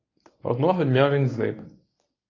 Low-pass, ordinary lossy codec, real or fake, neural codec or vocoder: 7.2 kHz; AAC, 32 kbps; fake; codec, 24 kHz, 0.9 kbps, WavTokenizer, medium speech release version 1